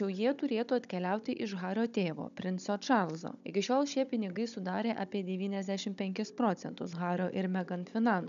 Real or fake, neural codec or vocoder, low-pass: fake; codec, 16 kHz, 16 kbps, FunCodec, trained on Chinese and English, 50 frames a second; 7.2 kHz